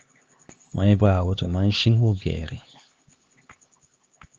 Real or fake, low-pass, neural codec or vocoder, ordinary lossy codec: fake; 7.2 kHz; codec, 16 kHz, 2 kbps, X-Codec, HuBERT features, trained on LibriSpeech; Opus, 24 kbps